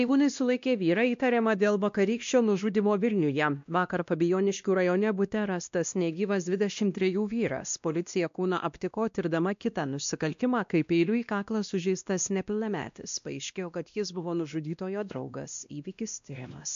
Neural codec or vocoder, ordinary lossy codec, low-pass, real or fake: codec, 16 kHz, 1 kbps, X-Codec, WavLM features, trained on Multilingual LibriSpeech; MP3, 64 kbps; 7.2 kHz; fake